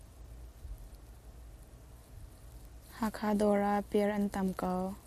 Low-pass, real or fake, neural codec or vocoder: 14.4 kHz; real; none